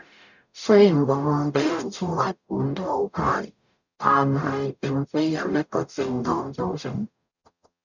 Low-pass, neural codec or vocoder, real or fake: 7.2 kHz; codec, 44.1 kHz, 0.9 kbps, DAC; fake